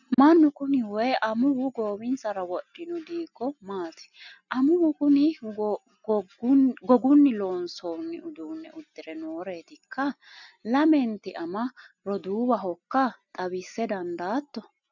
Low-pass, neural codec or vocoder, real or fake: 7.2 kHz; none; real